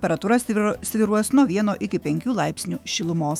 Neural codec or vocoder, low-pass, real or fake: autoencoder, 48 kHz, 128 numbers a frame, DAC-VAE, trained on Japanese speech; 19.8 kHz; fake